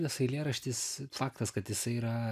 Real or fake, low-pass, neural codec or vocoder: real; 14.4 kHz; none